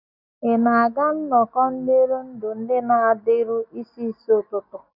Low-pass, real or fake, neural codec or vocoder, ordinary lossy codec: 5.4 kHz; real; none; none